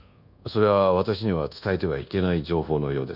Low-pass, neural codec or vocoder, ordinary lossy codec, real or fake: 5.4 kHz; codec, 24 kHz, 1.2 kbps, DualCodec; none; fake